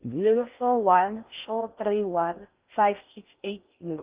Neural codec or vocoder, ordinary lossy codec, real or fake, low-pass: codec, 16 kHz in and 24 kHz out, 0.6 kbps, FocalCodec, streaming, 4096 codes; Opus, 24 kbps; fake; 3.6 kHz